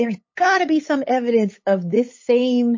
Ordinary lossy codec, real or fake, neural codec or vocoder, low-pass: MP3, 32 kbps; fake; codec, 16 kHz, 8 kbps, FunCodec, trained on LibriTTS, 25 frames a second; 7.2 kHz